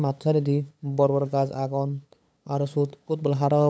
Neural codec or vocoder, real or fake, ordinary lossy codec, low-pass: codec, 16 kHz, 8 kbps, FunCodec, trained on LibriTTS, 25 frames a second; fake; none; none